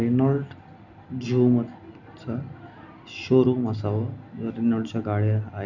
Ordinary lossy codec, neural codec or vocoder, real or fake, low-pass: none; none; real; 7.2 kHz